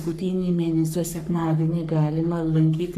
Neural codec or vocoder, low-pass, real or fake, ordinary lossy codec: codec, 44.1 kHz, 3.4 kbps, Pupu-Codec; 14.4 kHz; fake; AAC, 96 kbps